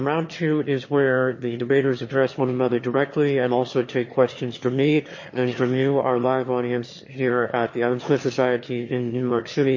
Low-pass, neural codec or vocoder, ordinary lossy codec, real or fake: 7.2 kHz; autoencoder, 22.05 kHz, a latent of 192 numbers a frame, VITS, trained on one speaker; MP3, 32 kbps; fake